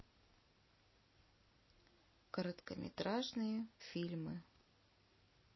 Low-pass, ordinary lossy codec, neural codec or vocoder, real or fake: 7.2 kHz; MP3, 24 kbps; none; real